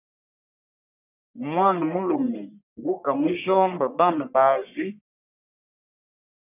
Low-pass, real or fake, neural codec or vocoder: 3.6 kHz; fake; codec, 44.1 kHz, 1.7 kbps, Pupu-Codec